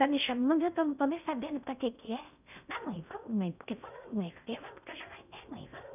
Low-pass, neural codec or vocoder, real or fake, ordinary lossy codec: 3.6 kHz; codec, 16 kHz in and 24 kHz out, 0.6 kbps, FocalCodec, streaming, 4096 codes; fake; none